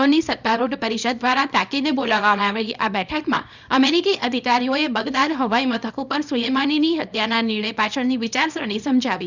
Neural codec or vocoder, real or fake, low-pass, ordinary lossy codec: codec, 24 kHz, 0.9 kbps, WavTokenizer, small release; fake; 7.2 kHz; none